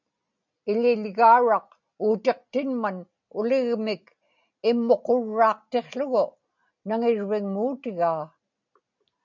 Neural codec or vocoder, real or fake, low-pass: none; real; 7.2 kHz